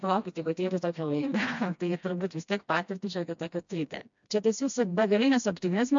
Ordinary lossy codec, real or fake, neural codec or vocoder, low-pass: MP3, 64 kbps; fake; codec, 16 kHz, 1 kbps, FreqCodec, smaller model; 7.2 kHz